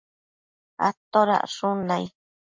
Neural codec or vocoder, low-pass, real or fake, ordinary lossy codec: none; 7.2 kHz; real; MP3, 48 kbps